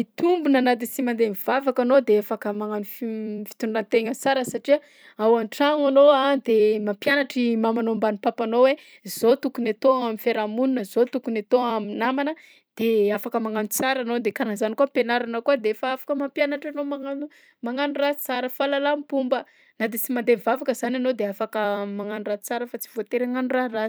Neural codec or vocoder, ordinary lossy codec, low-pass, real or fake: vocoder, 44.1 kHz, 128 mel bands every 256 samples, BigVGAN v2; none; none; fake